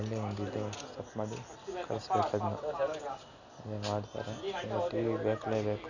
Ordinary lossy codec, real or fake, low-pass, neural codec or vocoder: none; real; 7.2 kHz; none